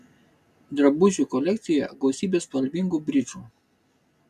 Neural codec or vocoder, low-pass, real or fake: none; 14.4 kHz; real